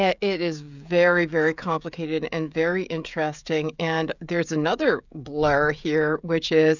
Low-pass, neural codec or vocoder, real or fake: 7.2 kHz; codec, 16 kHz, 16 kbps, FreqCodec, smaller model; fake